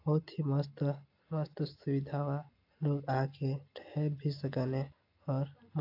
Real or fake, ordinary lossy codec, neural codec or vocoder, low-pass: real; AAC, 32 kbps; none; 5.4 kHz